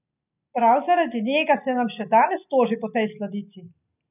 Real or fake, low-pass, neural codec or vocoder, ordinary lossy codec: real; 3.6 kHz; none; none